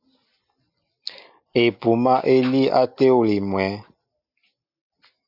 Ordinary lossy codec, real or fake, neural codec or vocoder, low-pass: Opus, 64 kbps; real; none; 5.4 kHz